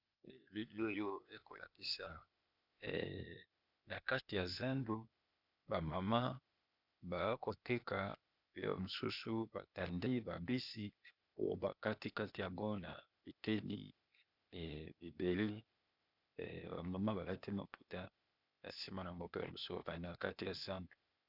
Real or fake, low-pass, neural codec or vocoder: fake; 5.4 kHz; codec, 16 kHz, 0.8 kbps, ZipCodec